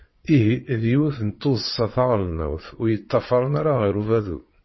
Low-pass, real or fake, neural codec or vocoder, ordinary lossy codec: 7.2 kHz; fake; vocoder, 22.05 kHz, 80 mel bands, Vocos; MP3, 24 kbps